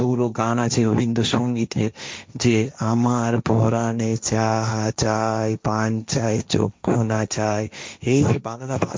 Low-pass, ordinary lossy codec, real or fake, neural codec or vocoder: none; none; fake; codec, 16 kHz, 1.1 kbps, Voila-Tokenizer